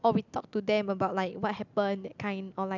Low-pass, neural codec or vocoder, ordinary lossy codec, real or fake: 7.2 kHz; none; none; real